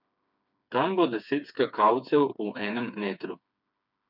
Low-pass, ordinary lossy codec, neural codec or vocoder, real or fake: 5.4 kHz; none; codec, 16 kHz, 4 kbps, FreqCodec, smaller model; fake